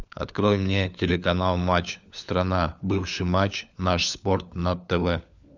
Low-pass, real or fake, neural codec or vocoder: 7.2 kHz; fake; codec, 16 kHz, 4 kbps, FunCodec, trained on LibriTTS, 50 frames a second